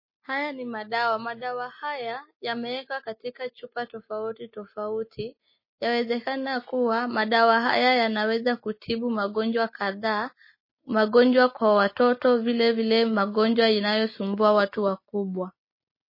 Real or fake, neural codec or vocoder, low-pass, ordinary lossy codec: real; none; 5.4 kHz; MP3, 24 kbps